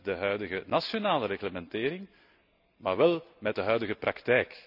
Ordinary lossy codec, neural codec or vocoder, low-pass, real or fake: none; none; 5.4 kHz; real